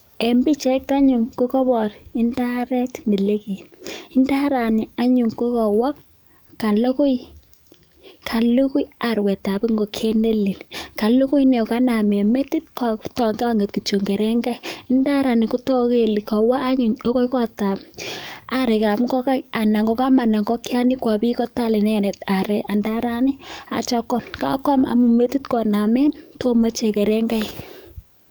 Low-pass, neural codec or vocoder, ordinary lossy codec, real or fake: none; codec, 44.1 kHz, 7.8 kbps, DAC; none; fake